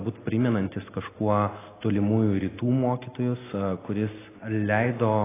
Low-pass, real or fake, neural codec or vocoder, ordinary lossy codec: 3.6 kHz; real; none; AAC, 16 kbps